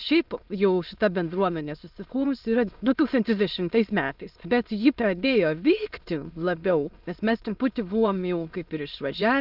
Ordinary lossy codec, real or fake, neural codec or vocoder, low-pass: Opus, 32 kbps; fake; autoencoder, 22.05 kHz, a latent of 192 numbers a frame, VITS, trained on many speakers; 5.4 kHz